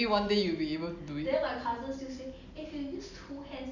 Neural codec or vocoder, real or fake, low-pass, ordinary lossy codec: none; real; 7.2 kHz; none